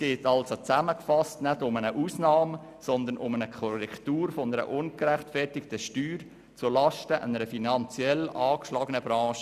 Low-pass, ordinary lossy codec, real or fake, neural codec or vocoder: 14.4 kHz; none; real; none